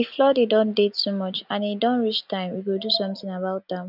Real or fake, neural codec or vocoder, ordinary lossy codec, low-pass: real; none; none; 5.4 kHz